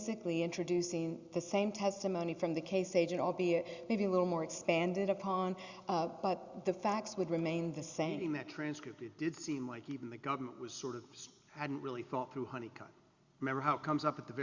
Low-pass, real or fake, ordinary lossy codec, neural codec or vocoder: 7.2 kHz; real; Opus, 64 kbps; none